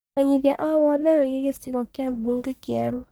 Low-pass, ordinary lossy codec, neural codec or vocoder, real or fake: none; none; codec, 44.1 kHz, 1.7 kbps, Pupu-Codec; fake